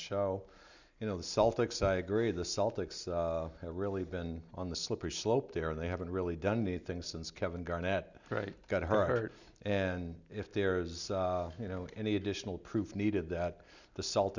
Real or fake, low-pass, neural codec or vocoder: real; 7.2 kHz; none